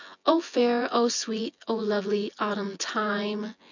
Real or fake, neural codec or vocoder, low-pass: fake; vocoder, 24 kHz, 100 mel bands, Vocos; 7.2 kHz